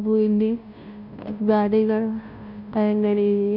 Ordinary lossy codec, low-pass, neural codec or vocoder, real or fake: none; 5.4 kHz; codec, 16 kHz, 0.5 kbps, FunCodec, trained on Chinese and English, 25 frames a second; fake